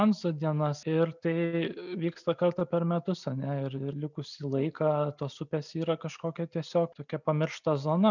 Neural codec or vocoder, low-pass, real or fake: none; 7.2 kHz; real